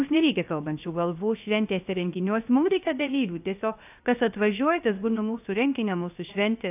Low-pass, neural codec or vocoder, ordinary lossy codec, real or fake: 3.6 kHz; codec, 16 kHz, 0.3 kbps, FocalCodec; AAC, 32 kbps; fake